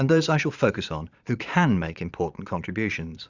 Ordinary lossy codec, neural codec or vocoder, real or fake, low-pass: Opus, 64 kbps; vocoder, 22.05 kHz, 80 mel bands, Vocos; fake; 7.2 kHz